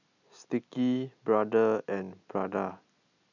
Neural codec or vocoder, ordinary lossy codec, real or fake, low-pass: none; none; real; 7.2 kHz